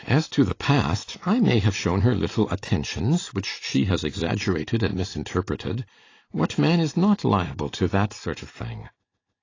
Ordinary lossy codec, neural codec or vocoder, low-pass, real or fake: AAC, 32 kbps; none; 7.2 kHz; real